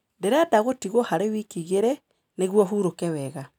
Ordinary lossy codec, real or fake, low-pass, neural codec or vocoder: none; real; 19.8 kHz; none